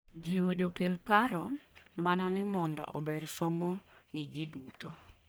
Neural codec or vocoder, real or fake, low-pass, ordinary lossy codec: codec, 44.1 kHz, 1.7 kbps, Pupu-Codec; fake; none; none